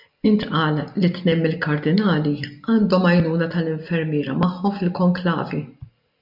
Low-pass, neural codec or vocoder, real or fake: 5.4 kHz; none; real